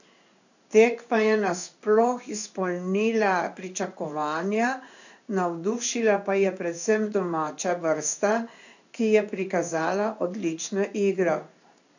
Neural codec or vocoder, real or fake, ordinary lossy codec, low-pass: codec, 16 kHz in and 24 kHz out, 1 kbps, XY-Tokenizer; fake; none; 7.2 kHz